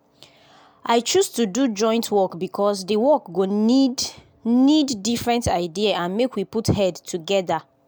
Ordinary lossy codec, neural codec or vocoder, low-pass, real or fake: none; none; none; real